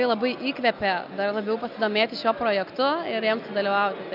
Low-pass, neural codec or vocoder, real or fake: 5.4 kHz; none; real